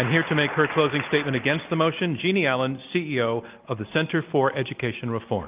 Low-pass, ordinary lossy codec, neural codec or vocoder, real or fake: 3.6 kHz; Opus, 24 kbps; none; real